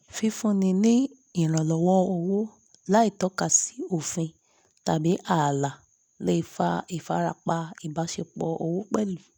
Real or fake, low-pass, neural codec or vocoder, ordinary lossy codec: real; none; none; none